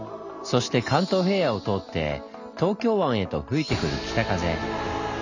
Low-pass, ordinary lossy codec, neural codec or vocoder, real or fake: 7.2 kHz; none; none; real